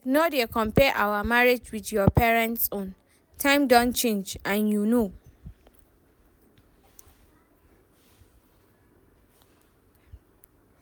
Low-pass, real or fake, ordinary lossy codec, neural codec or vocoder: none; real; none; none